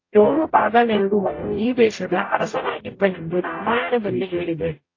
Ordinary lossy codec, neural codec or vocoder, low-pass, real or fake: AAC, 32 kbps; codec, 44.1 kHz, 0.9 kbps, DAC; 7.2 kHz; fake